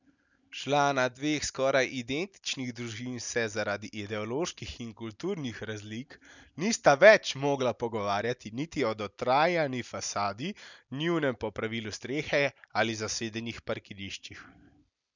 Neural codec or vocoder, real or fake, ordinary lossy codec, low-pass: none; real; none; 7.2 kHz